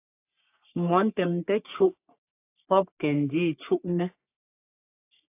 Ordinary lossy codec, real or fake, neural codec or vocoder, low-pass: AAC, 24 kbps; fake; codec, 44.1 kHz, 3.4 kbps, Pupu-Codec; 3.6 kHz